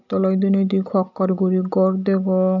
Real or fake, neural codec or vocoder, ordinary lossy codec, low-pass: real; none; none; 7.2 kHz